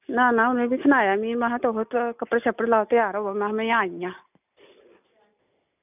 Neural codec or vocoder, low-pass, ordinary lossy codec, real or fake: none; 3.6 kHz; none; real